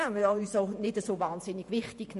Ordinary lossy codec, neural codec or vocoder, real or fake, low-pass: MP3, 48 kbps; vocoder, 48 kHz, 128 mel bands, Vocos; fake; 14.4 kHz